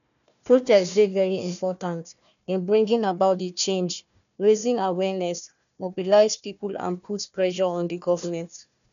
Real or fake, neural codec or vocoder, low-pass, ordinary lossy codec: fake; codec, 16 kHz, 1 kbps, FunCodec, trained on Chinese and English, 50 frames a second; 7.2 kHz; none